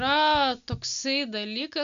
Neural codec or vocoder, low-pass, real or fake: none; 7.2 kHz; real